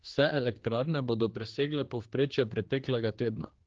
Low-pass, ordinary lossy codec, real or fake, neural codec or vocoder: 7.2 kHz; Opus, 16 kbps; fake; codec, 16 kHz, 2 kbps, X-Codec, HuBERT features, trained on general audio